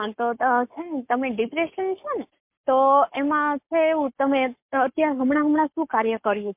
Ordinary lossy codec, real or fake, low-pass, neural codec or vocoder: MP3, 32 kbps; fake; 3.6 kHz; codec, 44.1 kHz, 7.8 kbps, Pupu-Codec